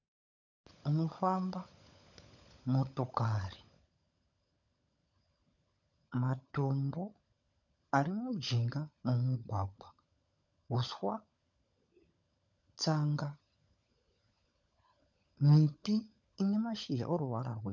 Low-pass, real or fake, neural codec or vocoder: 7.2 kHz; fake; codec, 16 kHz, 16 kbps, FunCodec, trained on LibriTTS, 50 frames a second